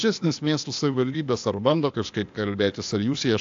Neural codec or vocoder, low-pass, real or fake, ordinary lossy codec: codec, 16 kHz, 0.8 kbps, ZipCodec; 7.2 kHz; fake; AAC, 64 kbps